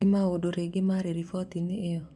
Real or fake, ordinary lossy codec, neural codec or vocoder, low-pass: fake; none; vocoder, 24 kHz, 100 mel bands, Vocos; none